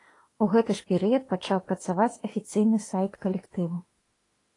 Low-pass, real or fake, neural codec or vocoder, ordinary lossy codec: 10.8 kHz; fake; autoencoder, 48 kHz, 32 numbers a frame, DAC-VAE, trained on Japanese speech; AAC, 32 kbps